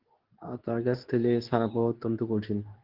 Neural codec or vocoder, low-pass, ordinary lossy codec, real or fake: codec, 24 kHz, 0.9 kbps, WavTokenizer, medium speech release version 2; 5.4 kHz; Opus, 16 kbps; fake